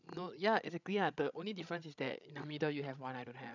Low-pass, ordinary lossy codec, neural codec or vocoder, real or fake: 7.2 kHz; none; codec, 16 kHz, 16 kbps, FreqCodec, larger model; fake